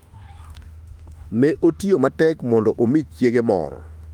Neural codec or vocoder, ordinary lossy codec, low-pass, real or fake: autoencoder, 48 kHz, 32 numbers a frame, DAC-VAE, trained on Japanese speech; Opus, 32 kbps; 19.8 kHz; fake